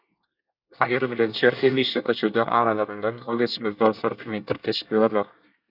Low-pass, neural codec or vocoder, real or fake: 5.4 kHz; codec, 24 kHz, 1 kbps, SNAC; fake